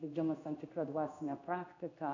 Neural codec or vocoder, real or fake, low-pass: codec, 16 kHz in and 24 kHz out, 1 kbps, XY-Tokenizer; fake; 7.2 kHz